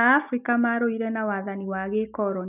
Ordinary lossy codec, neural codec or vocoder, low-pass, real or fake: none; none; 3.6 kHz; real